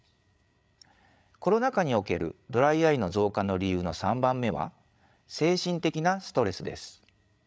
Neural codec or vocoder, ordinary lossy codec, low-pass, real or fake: codec, 16 kHz, 8 kbps, FreqCodec, larger model; none; none; fake